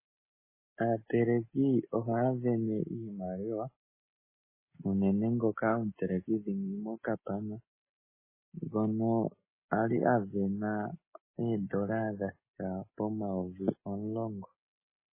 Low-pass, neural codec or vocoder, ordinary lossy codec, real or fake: 3.6 kHz; none; MP3, 16 kbps; real